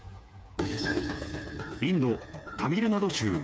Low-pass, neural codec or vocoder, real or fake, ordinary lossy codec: none; codec, 16 kHz, 4 kbps, FreqCodec, smaller model; fake; none